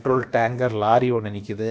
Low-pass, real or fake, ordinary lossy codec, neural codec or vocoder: none; fake; none; codec, 16 kHz, about 1 kbps, DyCAST, with the encoder's durations